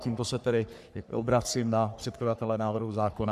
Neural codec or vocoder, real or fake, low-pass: codec, 44.1 kHz, 3.4 kbps, Pupu-Codec; fake; 14.4 kHz